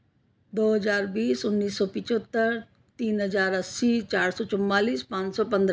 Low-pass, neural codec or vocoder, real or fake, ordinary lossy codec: none; none; real; none